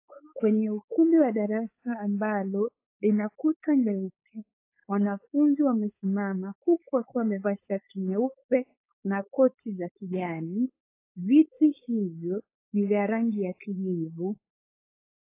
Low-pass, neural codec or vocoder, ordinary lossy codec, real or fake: 3.6 kHz; codec, 16 kHz, 4.8 kbps, FACodec; AAC, 24 kbps; fake